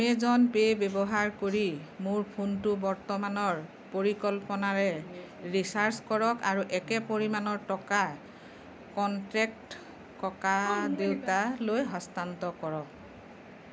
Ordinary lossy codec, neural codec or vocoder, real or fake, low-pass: none; none; real; none